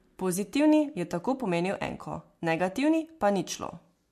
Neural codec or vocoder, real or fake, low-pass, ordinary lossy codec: none; real; 14.4 kHz; MP3, 64 kbps